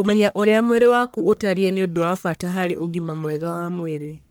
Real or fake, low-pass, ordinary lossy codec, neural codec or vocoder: fake; none; none; codec, 44.1 kHz, 1.7 kbps, Pupu-Codec